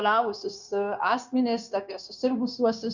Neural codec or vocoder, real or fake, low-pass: codec, 16 kHz, 0.9 kbps, LongCat-Audio-Codec; fake; 7.2 kHz